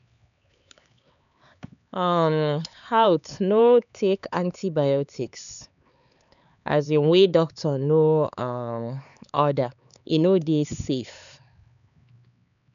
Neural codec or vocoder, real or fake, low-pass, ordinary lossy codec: codec, 16 kHz, 4 kbps, X-Codec, HuBERT features, trained on LibriSpeech; fake; 7.2 kHz; none